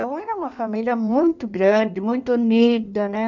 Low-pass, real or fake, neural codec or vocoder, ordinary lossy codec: 7.2 kHz; fake; codec, 16 kHz in and 24 kHz out, 1.1 kbps, FireRedTTS-2 codec; none